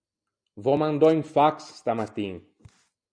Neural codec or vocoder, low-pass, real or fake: none; 9.9 kHz; real